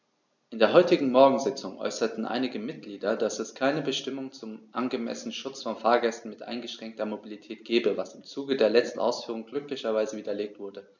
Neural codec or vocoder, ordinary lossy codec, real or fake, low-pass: none; none; real; 7.2 kHz